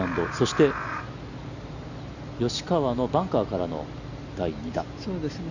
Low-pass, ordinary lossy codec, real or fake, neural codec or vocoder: 7.2 kHz; none; real; none